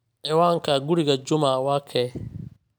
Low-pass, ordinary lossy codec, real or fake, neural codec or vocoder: none; none; real; none